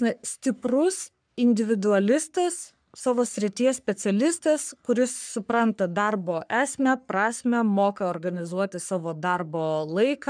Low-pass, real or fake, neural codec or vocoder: 9.9 kHz; fake; codec, 44.1 kHz, 3.4 kbps, Pupu-Codec